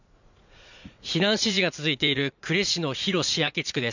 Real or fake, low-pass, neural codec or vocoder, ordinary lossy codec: fake; 7.2 kHz; vocoder, 44.1 kHz, 80 mel bands, Vocos; none